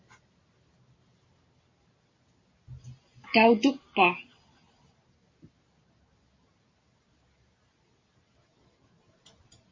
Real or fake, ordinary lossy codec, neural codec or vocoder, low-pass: real; MP3, 32 kbps; none; 7.2 kHz